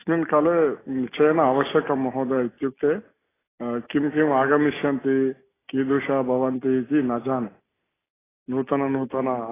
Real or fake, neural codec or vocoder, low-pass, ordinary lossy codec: real; none; 3.6 kHz; AAC, 16 kbps